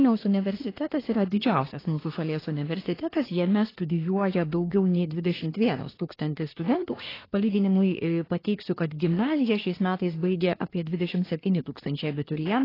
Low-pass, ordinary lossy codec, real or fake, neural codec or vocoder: 5.4 kHz; AAC, 24 kbps; fake; codec, 24 kHz, 1 kbps, SNAC